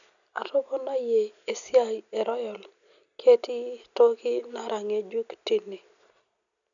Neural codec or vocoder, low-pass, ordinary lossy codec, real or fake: none; 7.2 kHz; none; real